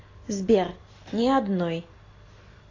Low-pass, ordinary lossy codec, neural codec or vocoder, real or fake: 7.2 kHz; AAC, 32 kbps; none; real